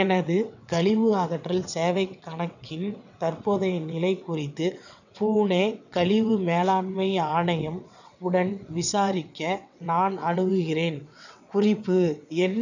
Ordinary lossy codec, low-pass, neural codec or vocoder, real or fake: none; 7.2 kHz; vocoder, 22.05 kHz, 80 mel bands, WaveNeXt; fake